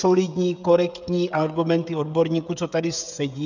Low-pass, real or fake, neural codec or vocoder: 7.2 kHz; fake; codec, 16 kHz, 16 kbps, FreqCodec, smaller model